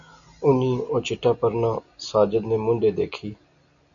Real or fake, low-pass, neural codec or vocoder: real; 7.2 kHz; none